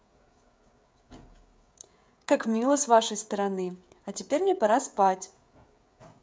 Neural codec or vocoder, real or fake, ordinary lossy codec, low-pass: codec, 16 kHz, 16 kbps, FreqCodec, smaller model; fake; none; none